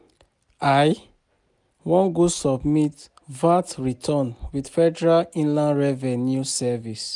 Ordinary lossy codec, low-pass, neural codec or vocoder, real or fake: none; 10.8 kHz; none; real